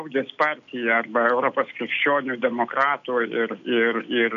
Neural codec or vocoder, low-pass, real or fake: none; 7.2 kHz; real